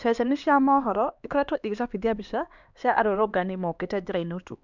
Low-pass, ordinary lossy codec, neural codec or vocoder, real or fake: 7.2 kHz; none; codec, 16 kHz, 2 kbps, X-Codec, HuBERT features, trained on LibriSpeech; fake